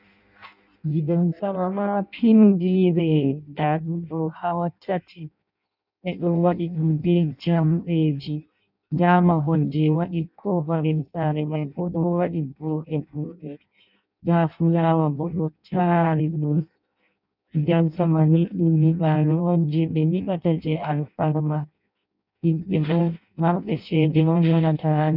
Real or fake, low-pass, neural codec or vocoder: fake; 5.4 kHz; codec, 16 kHz in and 24 kHz out, 0.6 kbps, FireRedTTS-2 codec